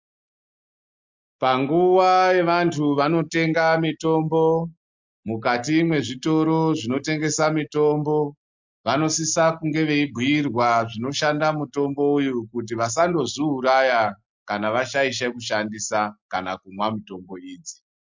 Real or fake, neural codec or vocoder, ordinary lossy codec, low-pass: real; none; MP3, 64 kbps; 7.2 kHz